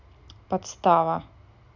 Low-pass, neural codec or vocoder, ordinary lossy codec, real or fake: 7.2 kHz; none; none; real